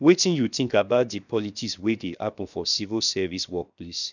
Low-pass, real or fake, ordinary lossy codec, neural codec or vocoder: 7.2 kHz; fake; none; codec, 16 kHz, 0.7 kbps, FocalCodec